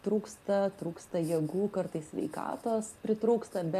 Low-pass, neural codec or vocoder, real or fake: 14.4 kHz; none; real